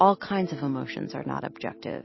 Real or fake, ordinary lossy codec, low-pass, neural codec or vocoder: fake; MP3, 24 kbps; 7.2 kHz; vocoder, 44.1 kHz, 128 mel bands every 256 samples, BigVGAN v2